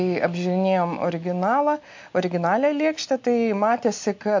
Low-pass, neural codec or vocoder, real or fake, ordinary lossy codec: 7.2 kHz; none; real; MP3, 48 kbps